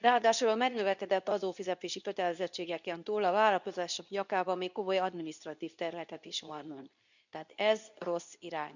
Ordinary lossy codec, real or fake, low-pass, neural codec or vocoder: none; fake; 7.2 kHz; codec, 24 kHz, 0.9 kbps, WavTokenizer, medium speech release version 2